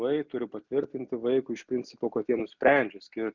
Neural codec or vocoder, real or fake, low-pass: none; real; 7.2 kHz